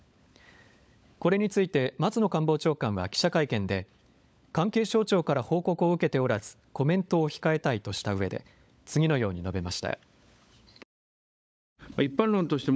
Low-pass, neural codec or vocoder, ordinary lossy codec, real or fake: none; codec, 16 kHz, 16 kbps, FunCodec, trained on LibriTTS, 50 frames a second; none; fake